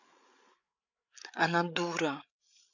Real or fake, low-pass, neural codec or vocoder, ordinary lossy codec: fake; 7.2 kHz; codec, 16 kHz, 8 kbps, FreqCodec, larger model; none